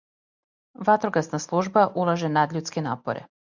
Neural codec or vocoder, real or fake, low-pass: none; real; 7.2 kHz